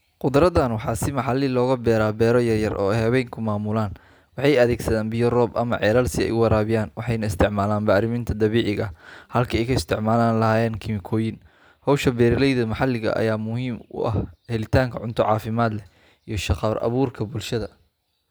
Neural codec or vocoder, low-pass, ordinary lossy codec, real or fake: none; none; none; real